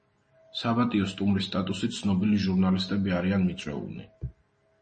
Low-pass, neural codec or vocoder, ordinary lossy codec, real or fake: 9.9 kHz; none; MP3, 32 kbps; real